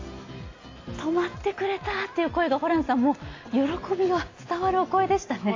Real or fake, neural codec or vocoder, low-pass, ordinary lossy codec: real; none; 7.2 kHz; AAC, 32 kbps